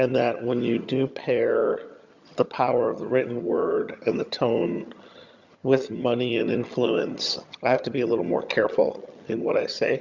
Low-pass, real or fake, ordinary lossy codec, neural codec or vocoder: 7.2 kHz; fake; Opus, 64 kbps; vocoder, 22.05 kHz, 80 mel bands, HiFi-GAN